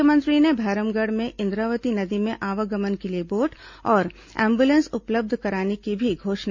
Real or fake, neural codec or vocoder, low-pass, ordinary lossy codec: real; none; 7.2 kHz; MP3, 48 kbps